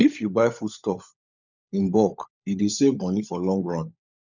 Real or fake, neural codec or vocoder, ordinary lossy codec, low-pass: fake; codec, 16 kHz, 16 kbps, FunCodec, trained on LibriTTS, 50 frames a second; none; 7.2 kHz